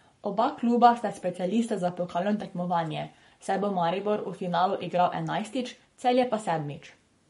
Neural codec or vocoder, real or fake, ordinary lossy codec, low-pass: codec, 44.1 kHz, 7.8 kbps, Pupu-Codec; fake; MP3, 48 kbps; 19.8 kHz